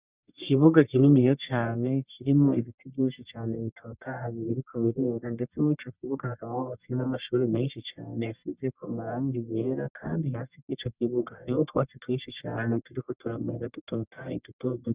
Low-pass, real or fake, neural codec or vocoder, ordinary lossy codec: 3.6 kHz; fake; codec, 44.1 kHz, 1.7 kbps, Pupu-Codec; Opus, 64 kbps